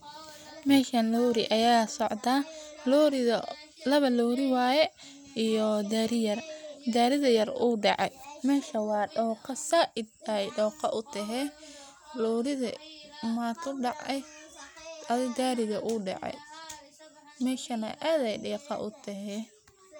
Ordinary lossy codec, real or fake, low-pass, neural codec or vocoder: none; real; none; none